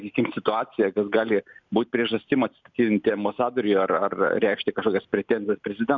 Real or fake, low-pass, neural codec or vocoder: real; 7.2 kHz; none